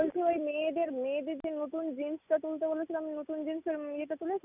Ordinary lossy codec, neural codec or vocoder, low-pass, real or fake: none; none; 3.6 kHz; real